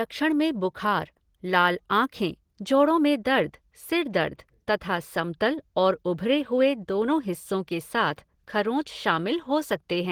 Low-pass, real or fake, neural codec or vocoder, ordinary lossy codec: 19.8 kHz; real; none; Opus, 16 kbps